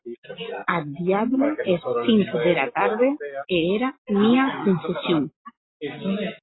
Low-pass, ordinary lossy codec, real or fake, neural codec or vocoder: 7.2 kHz; AAC, 16 kbps; real; none